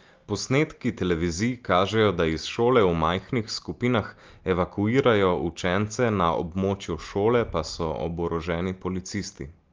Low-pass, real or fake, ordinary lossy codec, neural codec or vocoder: 7.2 kHz; real; Opus, 24 kbps; none